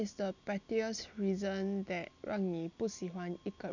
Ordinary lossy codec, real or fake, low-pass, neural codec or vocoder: none; real; 7.2 kHz; none